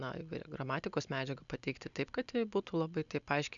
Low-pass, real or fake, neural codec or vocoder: 7.2 kHz; real; none